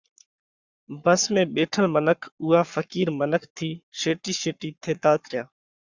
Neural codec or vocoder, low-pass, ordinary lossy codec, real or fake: codec, 44.1 kHz, 7.8 kbps, Pupu-Codec; 7.2 kHz; Opus, 64 kbps; fake